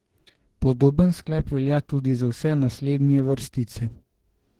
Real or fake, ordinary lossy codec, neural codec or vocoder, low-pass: fake; Opus, 16 kbps; codec, 44.1 kHz, 2.6 kbps, DAC; 19.8 kHz